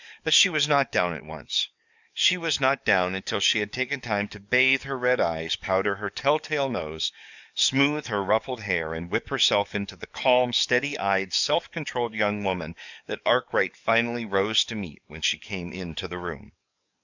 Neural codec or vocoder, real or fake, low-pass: codec, 44.1 kHz, 7.8 kbps, DAC; fake; 7.2 kHz